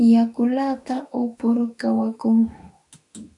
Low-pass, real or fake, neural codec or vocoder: 10.8 kHz; fake; autoencoder, 48 kHz, 32 numbers a frame, DAC-VAE, trained on Japanese speech